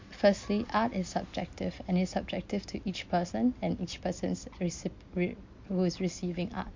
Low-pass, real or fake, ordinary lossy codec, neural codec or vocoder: 7.2 kHz; real; MP3, 48 kbps; none